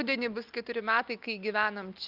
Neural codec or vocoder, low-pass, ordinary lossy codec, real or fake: none; 5.4 kHz; Opus, 24 kbps; real